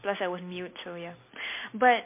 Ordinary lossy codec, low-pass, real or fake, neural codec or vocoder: MP3, 24 kbps; 3.6 kHz; real; none